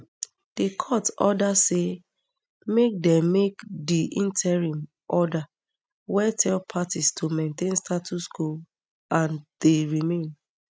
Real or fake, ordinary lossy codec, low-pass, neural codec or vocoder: real; none; none; none